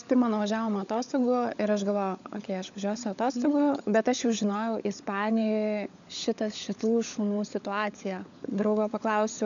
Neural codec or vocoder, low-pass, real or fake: codec, 16 kHz, 16 kbps, FunCodec, trained on LibriTTS, 50 frames a second; 7.2 kHz; fake